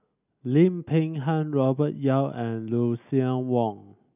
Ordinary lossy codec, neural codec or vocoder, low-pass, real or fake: none; none; 3.6 kHz; real